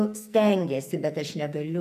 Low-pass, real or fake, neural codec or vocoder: 14.4 kHz; fake; codec, 44.1 kHz, 2.6 kbps, SNAC